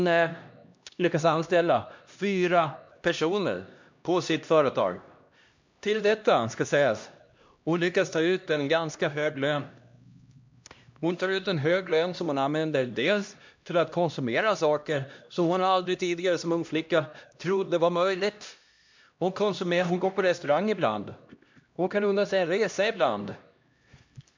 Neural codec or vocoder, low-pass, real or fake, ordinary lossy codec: codec, 16 kHz, 1 kbps, X-Codec, HuBERT features, trained on LibriSpeech; 7.2 kHz; fake; MP3, 64 kbps